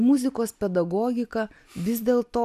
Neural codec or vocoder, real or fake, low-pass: none; real; 14.4 kHz